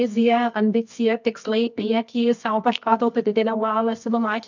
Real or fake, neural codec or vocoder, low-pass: fake; codec, 24 kHz, 0.9 kbps, WavTokenizer, medium music audio release; 7.2 kHz